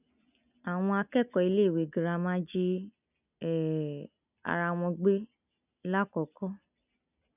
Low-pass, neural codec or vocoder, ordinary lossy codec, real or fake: 3.6 kHz; none; none; real